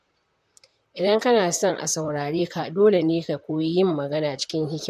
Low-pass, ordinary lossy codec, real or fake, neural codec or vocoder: 14.4 kHz; MP3, 96 kbps; fake; vocoder, 44.1 kHz, 128 mel bands, Pupu-Vocoder